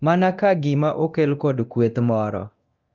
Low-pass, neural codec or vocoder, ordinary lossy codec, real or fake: 7.2 kHz; codec, 24 kHz, 0.9 kbps, DualCodec; Opus, 24 kbps; fake